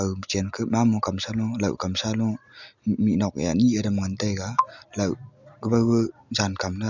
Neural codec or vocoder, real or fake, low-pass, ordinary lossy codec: none; real; 7.2 kHz; none